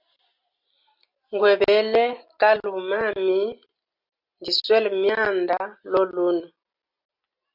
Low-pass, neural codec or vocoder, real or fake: 5.4 kHz; none; real